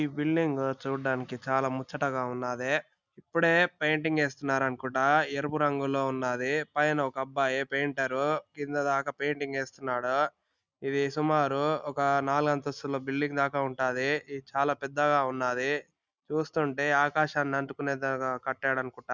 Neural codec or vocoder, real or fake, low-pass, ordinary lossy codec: none; real; 7.2 kHz; none